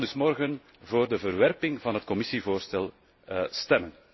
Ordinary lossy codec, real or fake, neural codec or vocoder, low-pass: MP3, 24 kbps; real; none; 7.2 kHz